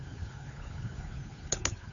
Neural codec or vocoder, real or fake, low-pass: codec, 16 kHz, 2 kbps, FunCodec, trained on Chinese and English, 25 frames a second; fake; 7.2 kHz